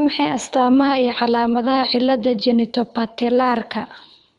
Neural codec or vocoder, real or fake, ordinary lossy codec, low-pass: codec, 24 kHz, 3 kbps, HILCodec; fake; none; 10.8 kHz